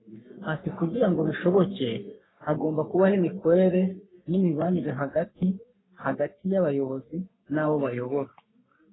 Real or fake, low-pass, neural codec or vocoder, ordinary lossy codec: fake; 7.2 kHz; codec, 44.1 kHz, 3.4 kbps, Pupu-Codec; AAC, 16 kbps